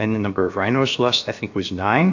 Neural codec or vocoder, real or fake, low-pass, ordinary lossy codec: codec, 16 kHz, about 1 kbps, DyCAST, with the encoder's durations; fake; 7.2 kHz; AAC, 48 kbps